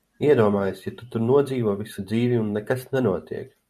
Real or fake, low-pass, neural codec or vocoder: fake; 14.4 kHz; vocoder, 44.1 kHz, 128 mel bands every 512 samples, BigVGAN v2